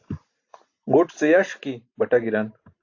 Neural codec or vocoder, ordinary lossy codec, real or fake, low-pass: none; AAC, 48 kbps; real; 7.2 kHz